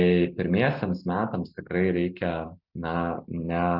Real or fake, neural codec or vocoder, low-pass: real; none; 5.4 kHz